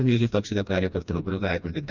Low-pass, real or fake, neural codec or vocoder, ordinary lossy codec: 7.2 kHz; fake; codec, 16 kHz, 1 kbps, FreqCodec, smaller model; none